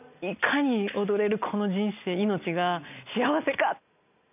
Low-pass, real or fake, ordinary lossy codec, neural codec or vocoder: 3.6 kHz; real; none; none